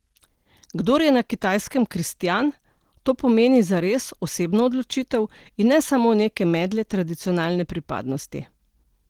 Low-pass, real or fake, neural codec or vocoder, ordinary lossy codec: 19.8 kHz; real; none; Opus, 16 kbps